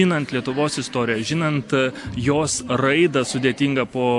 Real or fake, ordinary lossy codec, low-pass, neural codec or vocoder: real; AAC, 64 kbps; 10.8 kHz; none